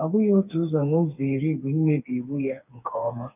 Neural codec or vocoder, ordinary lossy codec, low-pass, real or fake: codec, 16 kHz, 4 kbps, FreqCodec, smaller model; none; 3.6 kHz; fake